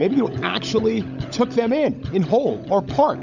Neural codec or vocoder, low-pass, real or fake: codec, 16 kHz, 16 kbps, FunCodec, trained on LibriTTS, 50 frames a second; 7.2 kHz; fake